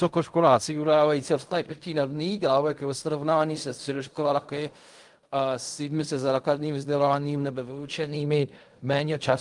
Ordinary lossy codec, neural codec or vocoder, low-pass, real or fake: Opus, 32 kbps; codec, 16 kHz in and 24 kHz out, 0.4 kbps, LongCat-Audio-Codec, fine tuned four codebook decoder; 10.8 kHz; fake